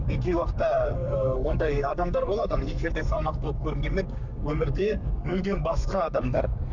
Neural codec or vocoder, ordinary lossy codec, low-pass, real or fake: codec, 32 kHz, 1.9 kbps, SNAC; none; 7.2 kHz; fake